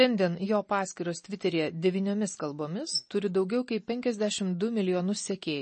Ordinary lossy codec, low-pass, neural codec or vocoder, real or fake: MP3, 32 kbps; 10.8 kHz; none; real